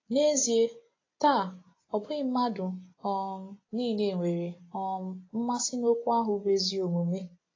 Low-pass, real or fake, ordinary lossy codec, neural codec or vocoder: 7.2 kHz; real; AAC, 32 kbps; none